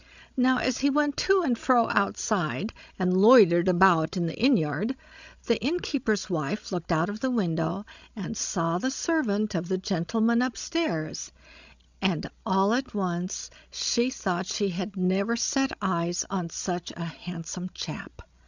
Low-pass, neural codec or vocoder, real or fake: 7.2 kHz; codec, 16 kHz, 16 kbps, FreqCodec, larger model; fake